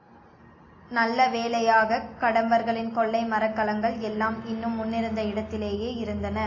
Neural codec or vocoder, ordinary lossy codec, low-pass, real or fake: none; AAC, 32 kbps; 7.2 kHz; real